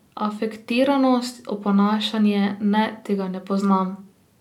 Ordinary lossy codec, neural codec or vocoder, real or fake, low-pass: none; none; real; 19.8 kHz